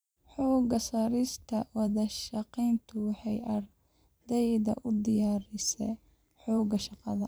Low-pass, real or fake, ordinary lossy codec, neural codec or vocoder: none; fake; none; vocoder, 44.1 kHz, 128 mel bands every 512 samples, BigVGAN v2